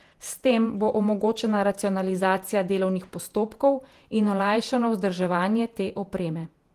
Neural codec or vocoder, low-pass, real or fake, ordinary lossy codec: vocoder, 48 kHz, 128 mel bands, Vocos; 14.4 kHz; fake; Opus, 24 kbps